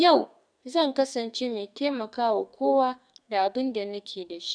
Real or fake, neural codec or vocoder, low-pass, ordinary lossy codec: fake; codec, 44.1 kHz, 2.6 kbps, SNAC; 9.9 kHz; none